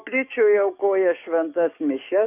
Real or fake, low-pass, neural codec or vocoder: fake; 3.6 kHz; codec, 44.1 kHz, 7.8 kbps, DAC